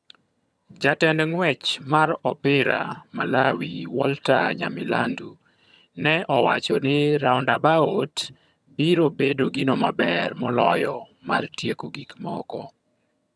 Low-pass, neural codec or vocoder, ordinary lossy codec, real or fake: none; vocoder, 22.05 kHz, 80 mel bands, HiFi-GAN; none; fake